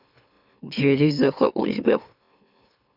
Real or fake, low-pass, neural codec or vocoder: fake; 5.4 kHz; autoencoder, 44.1 kHz, a latent of 192 numbers a frame, MeloTTS